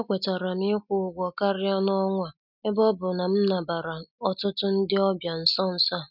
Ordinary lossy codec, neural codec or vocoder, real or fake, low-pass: none; none; real; 5.4 kHz